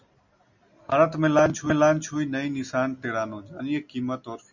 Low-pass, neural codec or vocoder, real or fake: 7.2 kHz; none; real